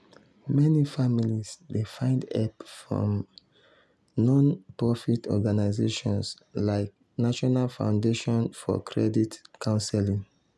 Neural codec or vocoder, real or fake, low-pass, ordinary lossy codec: none; real; none; none